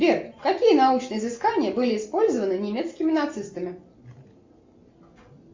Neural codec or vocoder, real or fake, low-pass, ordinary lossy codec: none; real; 7.2 kHz; MP3, 64 kbps